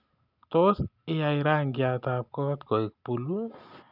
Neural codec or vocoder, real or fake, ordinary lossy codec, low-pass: none; real; none; 5.4 kHz